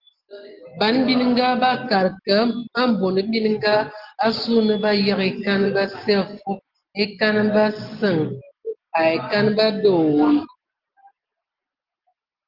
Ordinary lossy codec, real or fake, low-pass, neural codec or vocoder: Opus, 16 kbps; real; 5.4 kHz; none